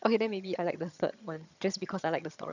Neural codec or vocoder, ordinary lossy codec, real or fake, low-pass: vocoder, 22.05 kHz, 80 mel bands, HiFi-GAN; none; fake; 7.2 kHz